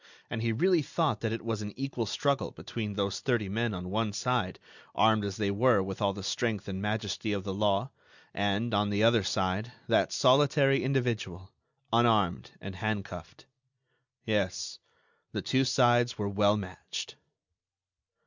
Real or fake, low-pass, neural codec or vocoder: real; 7.2 kHz; none